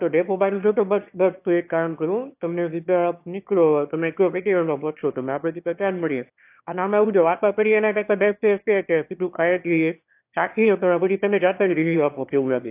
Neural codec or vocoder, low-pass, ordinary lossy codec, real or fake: autoencoder, 22.05 kHz, a latent of 192 numbers a frame, VITS, trained on one speaker; 3.6 kHz; AAC, 32 kbps; fake